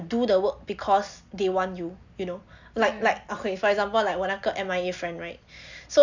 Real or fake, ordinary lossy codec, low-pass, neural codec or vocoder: real; none; 7.2 kHz; none